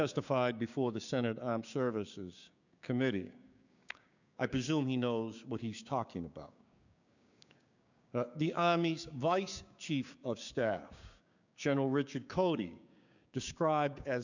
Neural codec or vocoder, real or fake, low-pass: codec, 16 kHz, 6 kbps, DAC; fake; 7.2 kHz